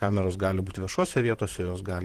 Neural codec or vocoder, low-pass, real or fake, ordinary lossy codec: vocoder, 44.1 kHz, 128 mel bands, Pupu-Vocoder; 14.4 kHz; fake; Opus, 16 kbps